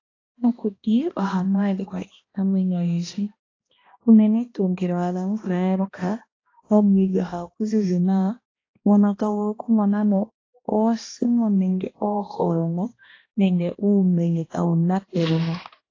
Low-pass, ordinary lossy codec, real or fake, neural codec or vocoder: 7.2 kHz; AAC, 32 kbps; fake; codec, 16 kHz, 1 kbps, X-Codec, HuBERT features, trained on balanced general audio